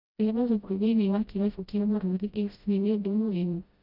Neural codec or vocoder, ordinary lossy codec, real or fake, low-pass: codec, 16 kHz, 0.5 kbps, FreqCodec, smaller model; none; fake; 5.4 kHz